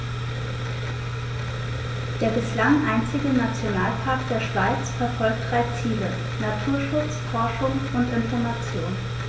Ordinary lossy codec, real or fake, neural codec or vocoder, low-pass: none; real; none; none